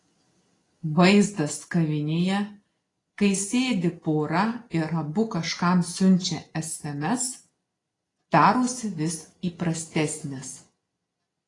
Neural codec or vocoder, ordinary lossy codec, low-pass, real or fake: none; AAC, 32 kbps; 10.8 kHz; real